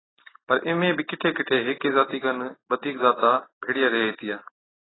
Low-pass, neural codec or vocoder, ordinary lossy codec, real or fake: 7.2 kHz; none; AAC, 16 kbps; real